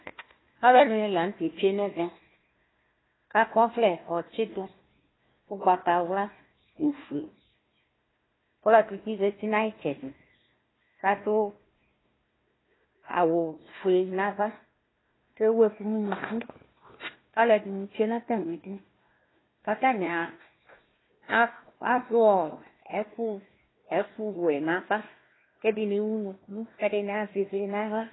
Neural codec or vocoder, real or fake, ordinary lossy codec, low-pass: codec, 16 kHz, 1 kbps, FunCodec, trained on Chinese and English, 50 frames a second; fake; AAC, 16 kbps; 7.2 kHz